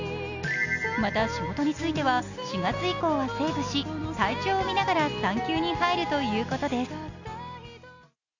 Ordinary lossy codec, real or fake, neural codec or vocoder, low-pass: none; real; none; 7.2 kHz